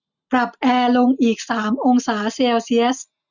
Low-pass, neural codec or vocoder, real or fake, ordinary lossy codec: 7.2 kHz; none; real; none